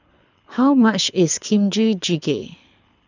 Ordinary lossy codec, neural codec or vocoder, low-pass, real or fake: none; codec, 24 kHz, 6 kbps, HILCodec; 7.2 kHz; fake